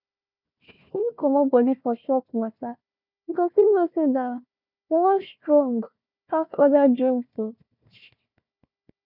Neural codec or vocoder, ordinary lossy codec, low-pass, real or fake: codec, 16 kHz, 1 kbps, FunCodec, trained on Chinese and English, 50 frames a second; none; 5.4 kHz; fake